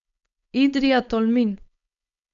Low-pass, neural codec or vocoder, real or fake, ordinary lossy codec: 7.2 kHz; codec, 16 kHz, 4.8 kbps, FACodec; fake; none